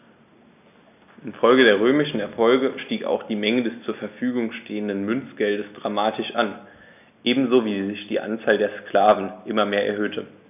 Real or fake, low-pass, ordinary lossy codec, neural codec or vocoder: real; 3.6 kHz; none; none